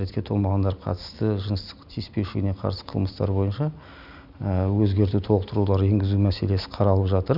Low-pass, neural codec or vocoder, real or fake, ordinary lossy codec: 5.4 kHz; none; real; none